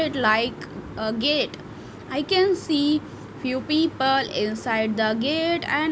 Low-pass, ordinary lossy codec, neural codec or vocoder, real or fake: none; none; none; real